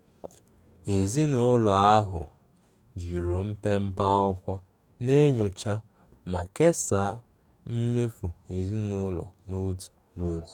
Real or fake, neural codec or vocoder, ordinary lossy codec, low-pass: fake; codec, 44.1 kHz, 2.6 kbps, DAC; none; 19.8 kHz